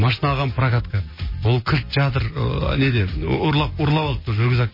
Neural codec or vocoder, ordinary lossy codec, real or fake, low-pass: none; MP3, 24 kbps; real; 5.4 kHz